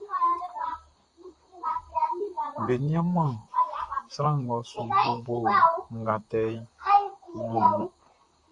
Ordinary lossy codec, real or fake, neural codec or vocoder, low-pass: AAC, 64 kbps; fake; vocoder, 44.1 kHz, 128 mel bands, Pupu-Vocoder; 10.8 kHz